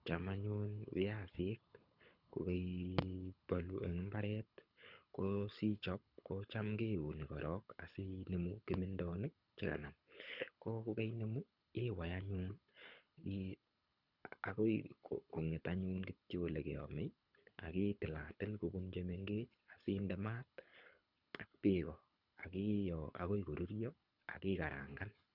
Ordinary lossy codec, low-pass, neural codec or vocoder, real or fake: none; 5.4 kHz; codec, 24 kHz, 6 kbps, HILCodec; fake